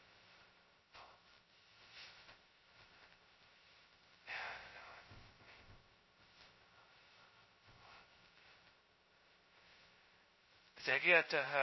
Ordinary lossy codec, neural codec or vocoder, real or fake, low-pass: MP3, 24 kbps; codec, 16 kHz, 0.2 kbps, FocalCodec; fake; 7.2 kHz